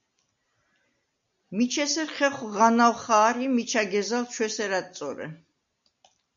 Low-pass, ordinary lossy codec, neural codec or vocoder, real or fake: 7.2 kHz; MP3, 64 kbps; none; real